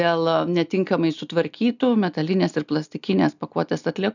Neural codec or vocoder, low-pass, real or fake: none; 7.2 kHz; real